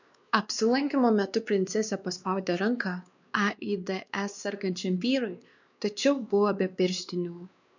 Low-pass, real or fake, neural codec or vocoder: 7.2 kHz; fake; codec, 16 kHz, 2 kbps, X-Codec, WavLM features, trained on Multilingual LibriSpeech